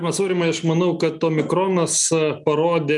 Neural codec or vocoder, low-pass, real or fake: none; 10.8 kHz; real